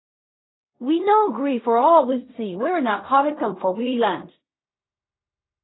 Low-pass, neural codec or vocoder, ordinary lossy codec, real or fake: 7.2 kHz; codec, 16 kHz in and 24 kHz out, 0.4 kbps, LongCat-Audio-Codec, fine tuned four codebook decoder; AAC, 16 kbps; fake